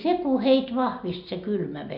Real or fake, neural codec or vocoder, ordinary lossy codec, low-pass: real; none; none; 5.4 kHz